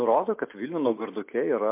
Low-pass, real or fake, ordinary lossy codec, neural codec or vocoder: 3.6 kHz; real; MP3, 24 kbps; none